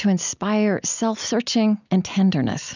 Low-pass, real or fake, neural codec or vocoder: 7.2 kHz; real; none